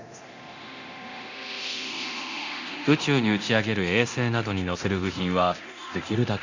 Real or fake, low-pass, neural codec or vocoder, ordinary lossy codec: fake; 7.2 kHz; codec, 24 kHz, 0.9 kbps, DualCodec; Opus, 64 kbps